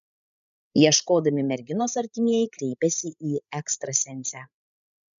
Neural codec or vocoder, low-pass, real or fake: codec, 16 kHz, 16 kbps, FreqCodec, larger model; 7.2 kHz; fake